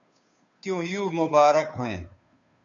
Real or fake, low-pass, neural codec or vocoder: fake; 7.2 kHz; codec, 16 kHz, 2 kbps, FunCodec, trained on Chinese and English, 25 frames a second